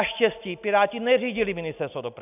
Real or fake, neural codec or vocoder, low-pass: real; none; 3.6 kHz